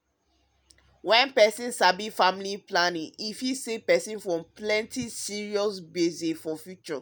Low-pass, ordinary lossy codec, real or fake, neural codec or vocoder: none; none; real; none